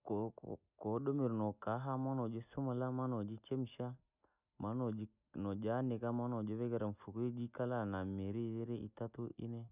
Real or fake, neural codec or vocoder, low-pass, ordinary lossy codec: real; none; 3.6 kHz; none